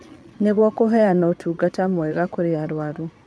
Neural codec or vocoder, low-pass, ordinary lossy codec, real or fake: vocoder, 22.05 kHz, 80 mel bands, Vocos; none; none; fake